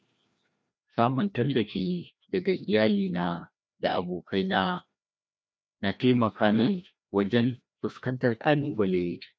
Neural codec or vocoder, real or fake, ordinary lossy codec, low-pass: codec, 16 kHz, 1 kbps, FreqCodec, larger model; fake; none; none